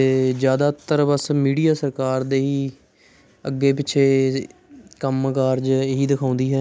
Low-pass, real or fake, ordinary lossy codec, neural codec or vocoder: none; real; none; none